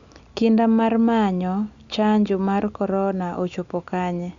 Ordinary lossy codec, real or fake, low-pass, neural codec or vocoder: Opus, 64 kbps; real; 7.2 kHz; none